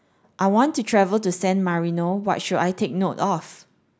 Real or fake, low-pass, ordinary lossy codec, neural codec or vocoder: real; none; none; none